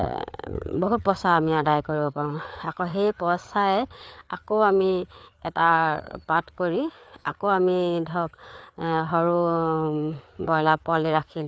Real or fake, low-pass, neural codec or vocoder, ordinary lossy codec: fake; none; codec, 16 kHz, 4 kbps, FreqCodec, larger model; none